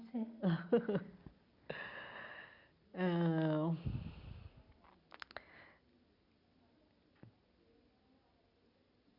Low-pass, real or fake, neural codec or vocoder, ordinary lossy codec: 5.4 kHz; real; none; none